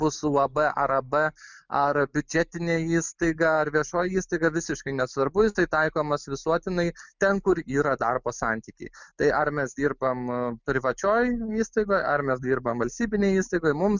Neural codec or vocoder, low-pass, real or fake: none; 7.2 kHz; real